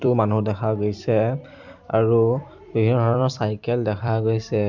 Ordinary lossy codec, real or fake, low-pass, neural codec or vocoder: none; fake; 7.2 kHz; vocoder, 44.1 kHz, 128 mel bands every 256 samples, BigVGAN v2